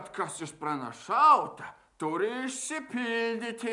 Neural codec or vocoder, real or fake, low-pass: none; real; 10.8 kHz